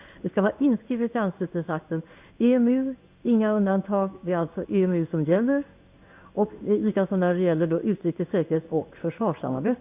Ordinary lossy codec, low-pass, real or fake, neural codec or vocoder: Opus, 64 kbps; 3.6 kHz; fake; codec, 16 kHz in and 24 kHz out, 1 kbps, XY-Tokenizer